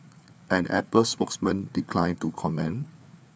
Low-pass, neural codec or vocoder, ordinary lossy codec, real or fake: none; codec, 16 kHz, 4 kbps, FreqCodec, larger model; none; fake